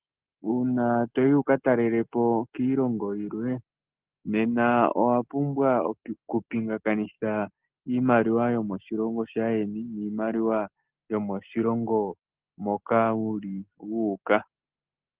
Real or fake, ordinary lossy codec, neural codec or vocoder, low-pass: real; Opus, 16 kbps; none; 3.6 kHz